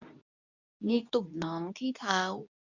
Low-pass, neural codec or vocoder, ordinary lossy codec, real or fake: 7.2 kHz; codec, 24 kHz, 0.9 kbps, WavTokenizer, medium speech release version 2; none; fake